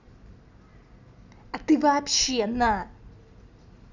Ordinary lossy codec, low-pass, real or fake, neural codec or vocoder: none; 7.2 kHz; real; none